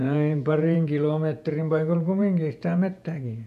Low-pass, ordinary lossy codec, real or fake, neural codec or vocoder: 14.4 kHz; none; fake; vocoder, 48 kHz, 128 mel bands, Vocos